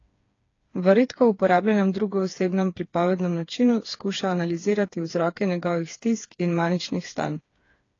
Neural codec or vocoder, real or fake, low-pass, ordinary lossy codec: codec, 16 kHz, 4 kbps, FreqCodec, smaller model; fake; 7.2 kHz; AAC, 32 kbps